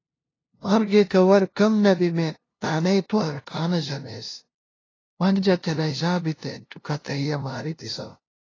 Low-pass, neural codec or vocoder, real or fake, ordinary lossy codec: 7.2 kHz; codec, 16 kHz, 0.5 kbps, FunCodec, trained on LibriTTS, 25 frames a second; fake; AAC, 32 kbps